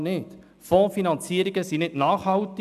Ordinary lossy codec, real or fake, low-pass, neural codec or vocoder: none; real; 14.4 kHz; none